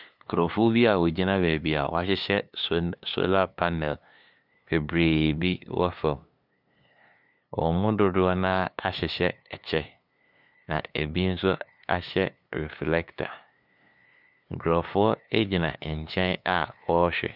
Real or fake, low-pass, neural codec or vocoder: fake; 5.4 kHz; codec, 16 kHz, 2 kbps, FunCodec, trained on LibriTTS, 25 frames a second